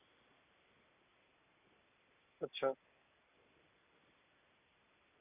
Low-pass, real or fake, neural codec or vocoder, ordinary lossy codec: 3.6 kHz; real; none; none